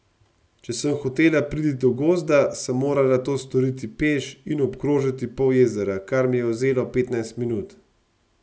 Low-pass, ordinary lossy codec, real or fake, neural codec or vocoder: none; none; real; none